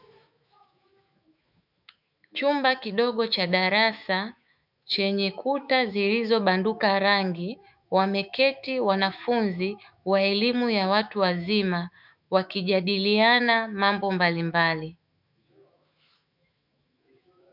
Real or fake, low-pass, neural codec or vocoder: fake; 5.4 kHz; autoencoder, 48 kHz, 128 numbers a frame, DAC-VAE, trained on Japanese speech